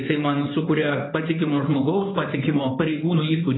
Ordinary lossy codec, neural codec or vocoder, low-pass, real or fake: AAC, 16 kbps; codec, 16 kHz in and 24 kHz out, 2.2 kbps, FireRedTTS-2 codec; 7.2 kHz; fake